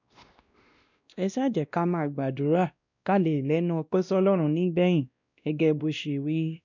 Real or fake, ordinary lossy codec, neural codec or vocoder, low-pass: fake; none; codec, 16 kHz, 1 kbps, X-Codec, WavLM features, trained on Multilingual LibriSpeech; 7.2 kHz